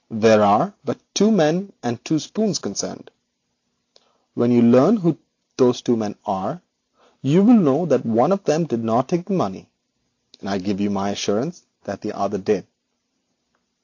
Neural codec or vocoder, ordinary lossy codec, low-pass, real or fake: none; AAC, 48 kbps; 7.2 kHz; real